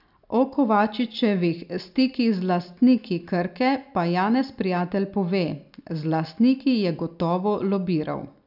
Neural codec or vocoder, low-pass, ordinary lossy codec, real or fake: none; 5.4 kHz; none; real